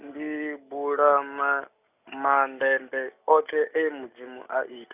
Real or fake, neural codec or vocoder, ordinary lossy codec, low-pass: real; none; none; 3.6 kHz